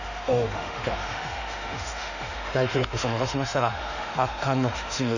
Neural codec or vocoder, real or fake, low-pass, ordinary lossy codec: autoencoder, 48 kHz, 32 numbers a frame, DAC-VAE, trained on Japanese speech; fake; 7.2 kHz; none